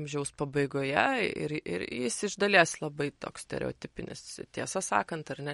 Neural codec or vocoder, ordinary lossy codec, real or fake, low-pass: none; MP3, 48 kbps; real; 19.8 kHz